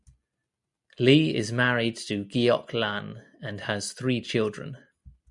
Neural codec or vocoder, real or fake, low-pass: none; real; 10.8 kHz